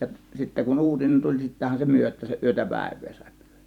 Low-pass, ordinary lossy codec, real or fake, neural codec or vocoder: 19.8 kHz; none; fake; vocoder, 44.1 kHz, 128 mel bands every 512 samples, BigVGAN v2